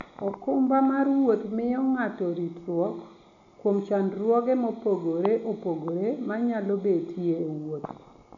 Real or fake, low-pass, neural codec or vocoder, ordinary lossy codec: real; 7.2 kHz; none; none